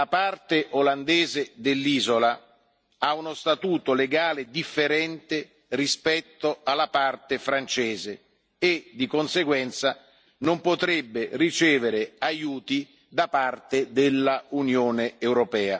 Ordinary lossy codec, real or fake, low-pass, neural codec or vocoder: none; real; none; none